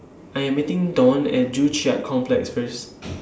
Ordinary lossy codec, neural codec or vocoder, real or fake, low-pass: none; none; real; none